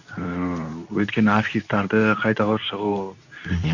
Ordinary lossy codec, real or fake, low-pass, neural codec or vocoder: none; fake; 7.2 kHz; codec, 24 kHz, 0.9 kbps, WavTokenizer, medium speech release version 2